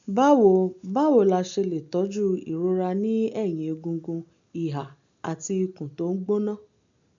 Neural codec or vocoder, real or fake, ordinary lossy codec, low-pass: none; real; none; 7.2 kHz